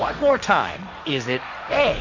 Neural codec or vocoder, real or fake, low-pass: codec, 16 kHz, 1.1 kbps, Voila-Tokenizer; fake; 7.2 kHz